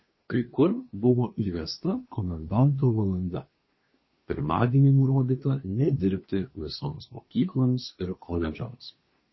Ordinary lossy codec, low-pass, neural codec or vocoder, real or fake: MP3, 24 kbps; 7.2 kHz; codec, 24 kHz, 1 kbps, SNAC; fake